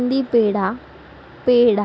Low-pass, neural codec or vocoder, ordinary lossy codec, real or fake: none; none; none; real